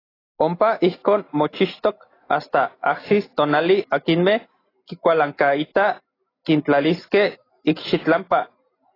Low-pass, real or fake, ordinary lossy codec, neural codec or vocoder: 5.4 kHz; real; AAC, 24 kbps; none